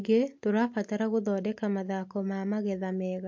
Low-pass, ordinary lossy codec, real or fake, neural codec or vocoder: 7.2 kHz; MP3, 48 kbps; real; none